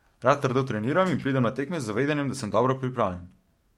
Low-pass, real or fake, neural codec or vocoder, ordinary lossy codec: 19.8 kHz; fake; codec, 44.1 kHz, 7.8 kbps, DAC; MP3, 64 kbps